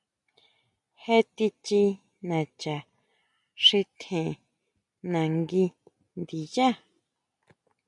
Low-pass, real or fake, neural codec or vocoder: 10.8 kHz; real; none